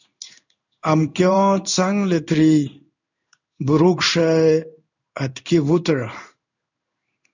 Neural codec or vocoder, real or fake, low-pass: codec, 16 kHz in and 24 kHz out, 1 kbps, XY-Tokenizer; fake; 7.2 kHz